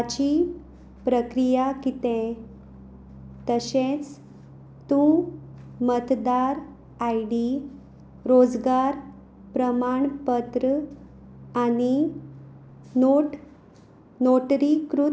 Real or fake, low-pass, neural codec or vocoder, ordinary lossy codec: real; none; none; none